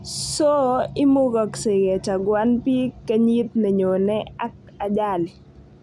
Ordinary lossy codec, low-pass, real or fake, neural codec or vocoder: none; none; real; none